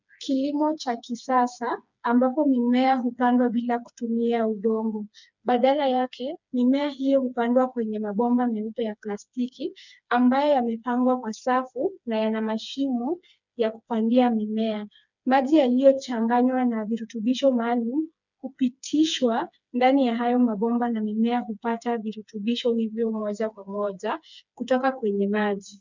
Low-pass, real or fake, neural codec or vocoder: 7.2 kHz; fake; codec, 16 kHz, 4 kbps, FreqCodec, smaller model